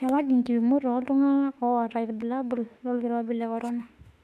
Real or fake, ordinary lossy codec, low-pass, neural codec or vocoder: fake; none; 14.4 kHz; autoencoder, 48 kHz, 32 numbers a frame, DAC-VAE, trained on Japanese speech